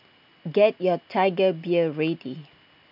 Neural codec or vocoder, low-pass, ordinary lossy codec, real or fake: none; 5.4 kHz; none; real